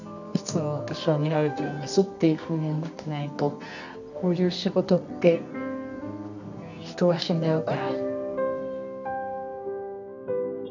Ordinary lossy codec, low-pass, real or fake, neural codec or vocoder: none; 7.2 kHz; fake; codec, 24 kHz, 0.9 kbps, WavTokenizer, medium music audio release